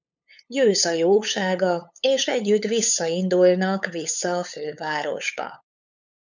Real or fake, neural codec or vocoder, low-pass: fake; codec, 16 kHz, 8 kbps, FunCodec, trained on LibriTTS, 25 frames a second; 7.2 kHz